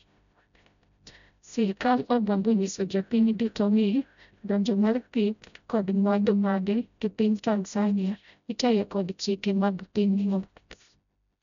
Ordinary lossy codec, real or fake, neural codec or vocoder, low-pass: none; fake; codec, 16 kHz, 0.5 kbps, FreqCodec, smaller model; 7.2 kHz